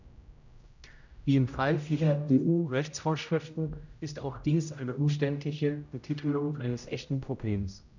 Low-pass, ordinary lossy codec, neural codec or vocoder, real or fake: 7.2 kHz; none; codec, 16 kHz, 0.5 kbps, X-Codec, HuBERT features, trained on general audio; fake